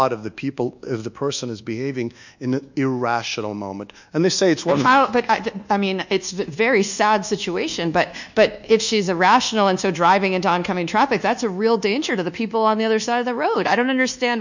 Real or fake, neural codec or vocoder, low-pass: fake; codec, 24 kHz, 1.2 kbps, DualCodec; 7.2 kHz